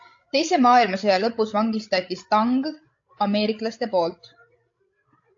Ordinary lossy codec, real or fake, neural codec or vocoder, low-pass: AAC, 48 kbps; fake; codec, 16 kHz, 16 kbps, FreqCodec, larger model; 7.2 kHz